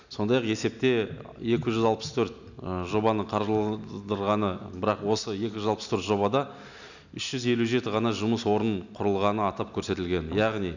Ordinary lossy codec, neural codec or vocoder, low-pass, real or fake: none; none; 7.2 kHz; real